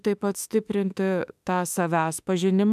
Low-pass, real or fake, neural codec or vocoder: 14.4 kHz; fake; autoencoder, 48 kHz, 32 numbers a frame, DAC-VAE, trained on Japanese speech